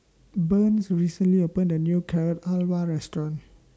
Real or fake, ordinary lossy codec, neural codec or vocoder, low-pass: real; none; none; none